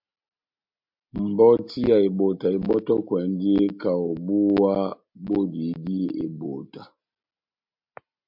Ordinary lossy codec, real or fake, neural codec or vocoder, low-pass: MP3, 48 kbps; real; none; 5.4 kHz